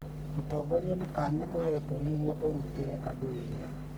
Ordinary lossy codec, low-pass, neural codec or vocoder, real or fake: none; none; codec, 44.1 kHz, 1.7 kbps, Pupu-Codec; fake